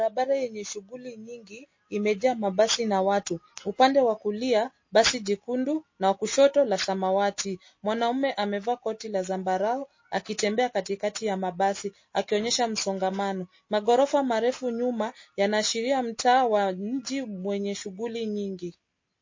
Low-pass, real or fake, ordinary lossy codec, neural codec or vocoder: 7.2 kHz; real; MP3, 32 kbps; none